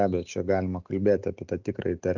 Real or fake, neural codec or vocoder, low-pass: real; none; 7.2 kHz